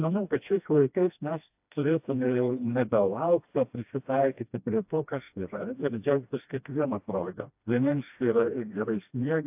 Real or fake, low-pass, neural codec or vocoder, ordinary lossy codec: fake; 3.6 kHz; codec, 16 kHz, 1 kbps, FreqCodec, smaller model; AAC, 32 kbps